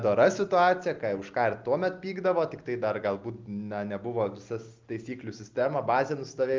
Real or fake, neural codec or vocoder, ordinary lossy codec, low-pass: real; none; Opus, 32 kbps; 7.2 kHz